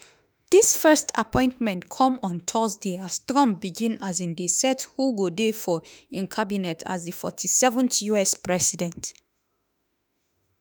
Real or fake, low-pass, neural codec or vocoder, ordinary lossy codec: fake; none; autoencoder, 48 kHz, 32 numbers a frame, DAC-VAE, trained on Japanese speech; none